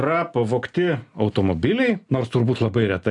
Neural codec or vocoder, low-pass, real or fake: none; 10.8 kHz; real